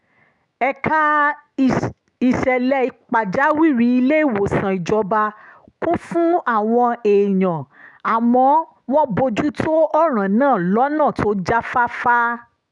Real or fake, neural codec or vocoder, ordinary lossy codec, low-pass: fake; autoencoder, 48 kHz, 128 numbers a frame, DAC-VAE, trained on Japanese speech; none; 10.8 kHz